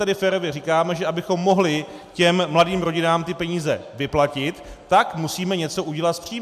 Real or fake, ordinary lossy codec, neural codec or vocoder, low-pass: real; AAC, 96 kbps; none; 14.4 kHz